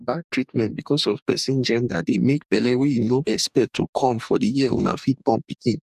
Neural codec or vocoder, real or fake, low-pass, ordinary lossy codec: codec, 44.1 kHz, 2.6 kbps, DAC; fake; 14.4 kHz; none